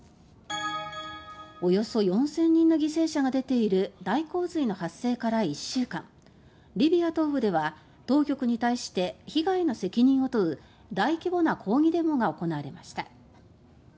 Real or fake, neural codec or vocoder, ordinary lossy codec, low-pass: real; none; none; none